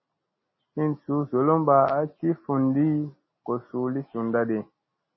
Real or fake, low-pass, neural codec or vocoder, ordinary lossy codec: real; 7.2 kHz; none; MP3, 24 kbps